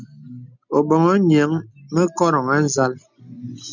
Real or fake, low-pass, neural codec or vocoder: real; 7.2 kHz; none